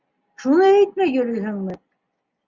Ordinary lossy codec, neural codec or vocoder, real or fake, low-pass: Opus, 64 kbps; none; real; 7.2 kHz